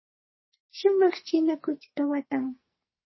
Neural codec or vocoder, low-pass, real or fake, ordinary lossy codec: codec, 44.1 kHz, 2.6 kbps, SNAC; 7.2 kHz; fake; MP3, 24 kbps